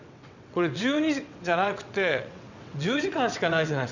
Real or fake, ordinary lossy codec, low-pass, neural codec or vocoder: fake; none; 7.2 kHz; vocoder, 22.05 kHz, 80 mel bands, WaveNeXt